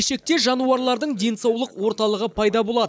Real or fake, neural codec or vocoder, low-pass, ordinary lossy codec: real; none; none; none